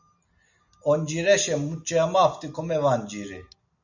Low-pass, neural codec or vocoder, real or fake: 7.2 kHz; none; real